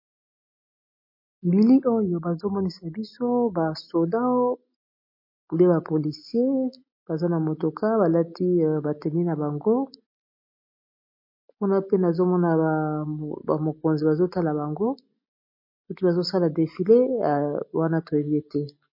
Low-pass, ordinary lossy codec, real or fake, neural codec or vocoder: 5.4 kHz; MP3, 32 kbps; real; none